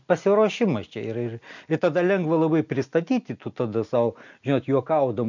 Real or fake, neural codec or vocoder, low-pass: real; none; 7.2 kHz